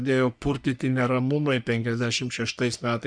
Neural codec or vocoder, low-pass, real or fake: codec, 44.1 kHz, 3.4 kbps, Pupu-Codec; 9.9 kHz; fake